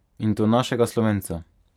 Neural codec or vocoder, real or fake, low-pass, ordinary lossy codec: vocoder, 44.1 kHz, 128 mel bands every 512 samples, BigVGAN v2; fake; 19.8 kHz; none